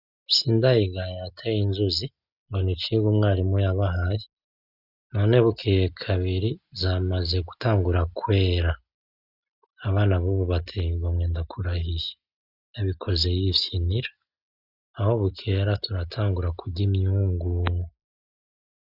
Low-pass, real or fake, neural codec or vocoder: 5.4 kHz; real; none